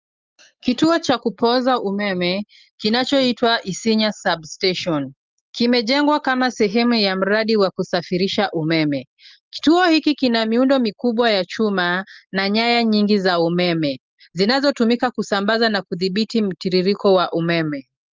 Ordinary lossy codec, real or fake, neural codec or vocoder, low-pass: Opus, 32 kbps; real; none; 7.2 kHz